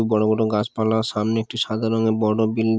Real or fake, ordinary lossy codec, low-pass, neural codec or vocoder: real; none; none; none